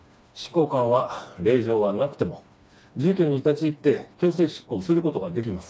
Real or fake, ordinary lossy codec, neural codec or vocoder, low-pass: fake; none; codec, 16 kHz, 2 kbps, FreqCodec, smaller model; none